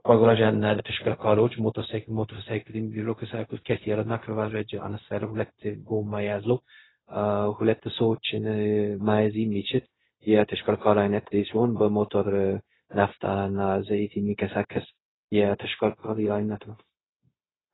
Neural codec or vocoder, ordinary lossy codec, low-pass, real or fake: codec, 16 kHz, 0.4 kbps, LongCat-Audio-Codec; AAC, 16 kbps; 7.2 kHz; fake